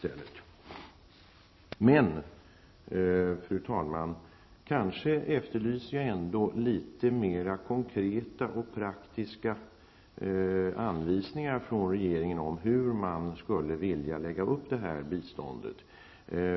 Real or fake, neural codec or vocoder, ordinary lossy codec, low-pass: real; none; MP3, 24 kbps; 7.2 kHz